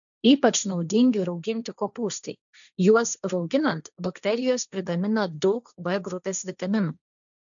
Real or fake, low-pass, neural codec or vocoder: fake; 7.2 kHz; codec, 16 kHz, 1.1 kbps, Voila-Tokenizer